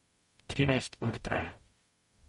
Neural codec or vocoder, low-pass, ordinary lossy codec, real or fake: codec, 44.1 kHz, 0.9 kbps, DAC; 19.8 kHz; MP3, 48 kbps; fake